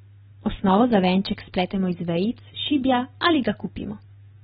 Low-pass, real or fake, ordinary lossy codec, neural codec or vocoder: 10.8 kHz; real; AAC, 16 kbps; none